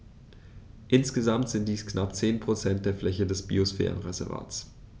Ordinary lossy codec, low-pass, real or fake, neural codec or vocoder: none; none; real; none